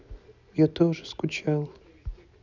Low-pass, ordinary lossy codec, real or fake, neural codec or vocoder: 7.2 kHz; none; real; none